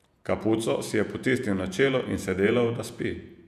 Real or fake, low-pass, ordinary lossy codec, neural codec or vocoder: fake; 14.4 kHz; none; vocoder, 48 kHz, 128 mel bands, Vocos